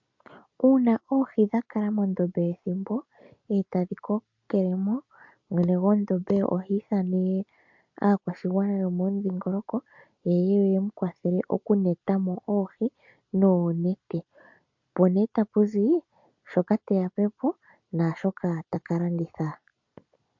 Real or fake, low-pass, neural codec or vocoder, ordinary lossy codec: real; 7.2 kHz; none; MP3, 48 kbps